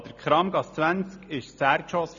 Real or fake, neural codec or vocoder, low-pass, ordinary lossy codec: real; none; 7.2 kHz; none